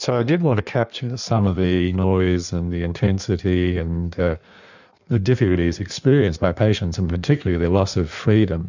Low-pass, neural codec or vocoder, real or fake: 7.2 kHz; codec, 16 kHz in and 24 kHz out, 1.1 kbps, FireRedTTS-2 codec; fake